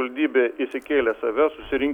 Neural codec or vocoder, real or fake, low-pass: none; real; 19.8 kHz